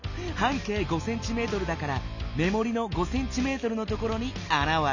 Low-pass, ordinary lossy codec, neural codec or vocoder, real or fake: 7.2 kHz; none; none; real